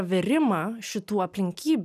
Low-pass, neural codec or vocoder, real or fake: 14.4 kHz; none; real